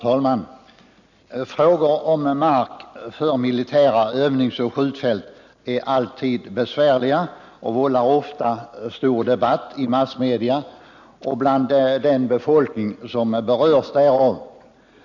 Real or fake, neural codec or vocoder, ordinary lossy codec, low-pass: real; none; none; 7.2 kHz